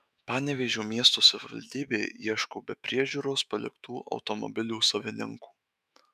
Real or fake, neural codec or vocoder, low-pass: fake; autoencoder, 48 kHz, 128 numbers a frame, DAC-VAE, trained on Japanese speech; 14.4 kHz